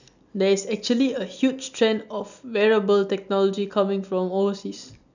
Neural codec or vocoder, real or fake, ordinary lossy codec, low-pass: none; real; none; 7.2 kHz